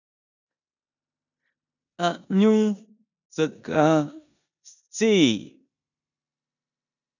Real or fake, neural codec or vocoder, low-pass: fake; codec, 16 kHz in and 24 kHz out, 0.9 kbps, LongCat-Audio-Codec, four codebook decoder; 7.2 kHz